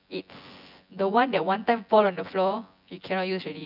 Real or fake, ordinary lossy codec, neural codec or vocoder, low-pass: fake; none; vocoder, 24 kHz, 100 mel bands, Vocos; 5.4 kHz